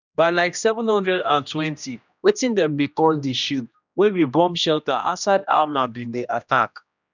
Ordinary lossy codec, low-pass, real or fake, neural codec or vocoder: none; 7.2 kHz; fake; codec, 16 kHz, 1 kbps, X-Codec, HuBERT features, trained on general audio